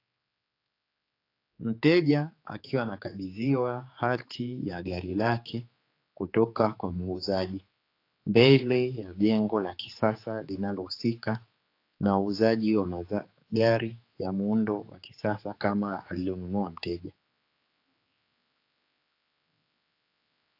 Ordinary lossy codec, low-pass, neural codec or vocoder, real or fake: AAC, 32 kbps; 5.4 kHz; codec, 16 kHz, 4 kbps, X-Codec, HuBERT features, trained on general audio; fake